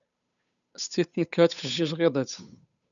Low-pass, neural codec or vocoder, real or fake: 7.2 kHz; codec, 16 kHz, 8 kbps, FunCodec, trained on Chinese and English, 25 frames a second; fake